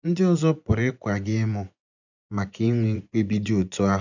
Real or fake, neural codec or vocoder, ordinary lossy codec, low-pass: fake; vocoder, 24 kHz, 100 mel bands, Vocos; none; 7.2 kHz